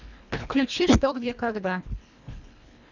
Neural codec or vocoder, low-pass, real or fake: codec, 24 kHz, 1.5 kbps, HILCodec; 7.2 kHz; fake